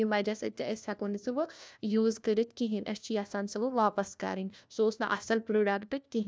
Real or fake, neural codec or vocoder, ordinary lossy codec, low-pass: fake; codec, 16 kHz, 1 kbps, FunCodec, trained on LibriTTS, 50 frames a second; none; none